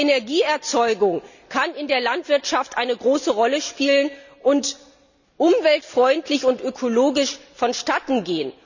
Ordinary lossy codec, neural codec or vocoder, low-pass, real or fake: none; none; 7.2 kHz; real